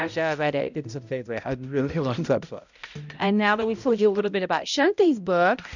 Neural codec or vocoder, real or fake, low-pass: codec, 16 kHz, 0.5 kbps, X-Codec, HuBERT features, trained on balanced general audio; fake; 7.2 kHz